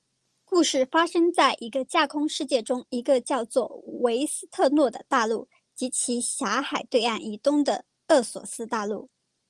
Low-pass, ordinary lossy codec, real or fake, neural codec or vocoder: 10.8 kHz; Opus, 24 kbps; real; none